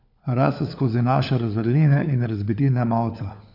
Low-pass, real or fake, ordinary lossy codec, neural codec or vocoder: 5.4 kHz; fake; none; codec, 16 kHz, 4 kbps, FunCodec, trained on LibriTTS, 50 frames a second